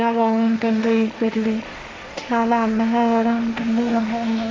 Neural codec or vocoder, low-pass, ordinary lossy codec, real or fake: codec, 16 kHz, 1.1 kbps, Voila-Tokenizer; none; none; fake